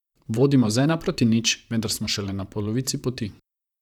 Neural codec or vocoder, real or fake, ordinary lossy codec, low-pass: vocoder, 44.1 kHz, 128 mel bands, Pupu-Vocoder; fake; none; 19.8 kHz